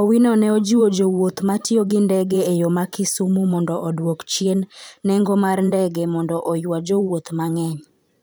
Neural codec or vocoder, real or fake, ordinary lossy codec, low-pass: vocoder, 44.1 kHz, 128 mel bands every 512 samples, BigVGAN v2; fake; none; none